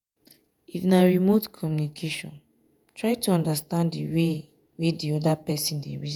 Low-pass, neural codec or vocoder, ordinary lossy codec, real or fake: none; vocoder, 48 kHz, 128 mel bands, Vocos; none; fake